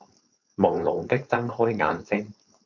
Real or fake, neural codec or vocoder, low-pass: fake; codec, 16 kHz, 4.8 kbps, FACodec; 7.2 kHz